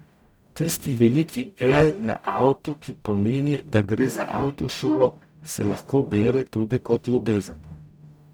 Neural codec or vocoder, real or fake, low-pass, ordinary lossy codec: codec, 44.1 kHz, 0.9 kbps, DAC; fake; none; none